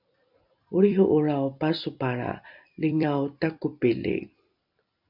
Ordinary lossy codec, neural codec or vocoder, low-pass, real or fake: MP3, 48 kbps; vocoder, 44.1 kHz, 128 mel bands every 256 samples, BigVGAN v2; 5.4 kHz; fake